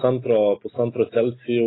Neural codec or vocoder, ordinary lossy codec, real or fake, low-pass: none; AAC, 16 kbps; real; 7.2 kHz